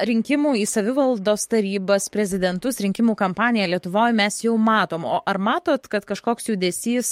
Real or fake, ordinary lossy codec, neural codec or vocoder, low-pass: fake; MP3, 64 kbps; codec, 44.1 kHz, 7.8 kbps, DAC; 19.8 kHz